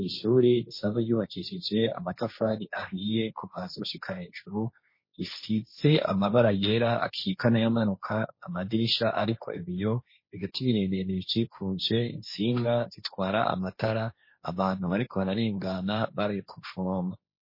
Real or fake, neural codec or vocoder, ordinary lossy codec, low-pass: fake; codec, 16 kHz, 1.1 kbps, Voila-Tokenizer; MP3, 24 kbps; 5.4 kHz